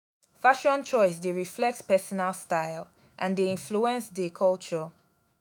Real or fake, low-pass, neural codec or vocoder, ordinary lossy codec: fake; none; autoencoder, 48 kHz, 128 numbers a frame, DAC-VAE, trained on Japanese speech; none